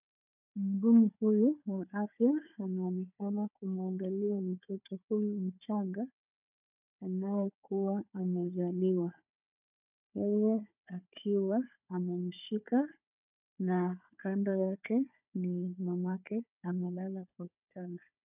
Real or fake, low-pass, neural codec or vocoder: fake; 3.6 kHz; codec, 16 kHz, 4 kbps, FunCodec, trained on Chinese and English, 50 frames a second